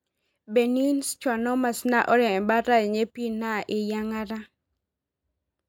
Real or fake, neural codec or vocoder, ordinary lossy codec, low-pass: real; none; MP3, 96 kbps; 19.8 kHz